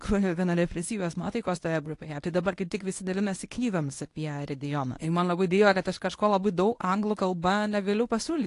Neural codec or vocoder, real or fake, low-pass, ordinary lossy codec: codec, 24 kHz, 0.9 kbps, WavTokenizer, medium speech release version 1; fake; 10.8 kHz; AAC, 48 kbps